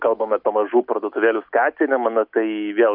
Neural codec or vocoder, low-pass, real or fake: none; 5.4 kHz; real